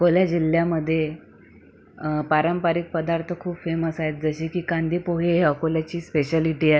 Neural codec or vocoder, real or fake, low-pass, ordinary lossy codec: none; real; none; none